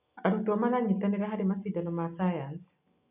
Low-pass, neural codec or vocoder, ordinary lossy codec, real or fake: 3.6 kHz; none; none; real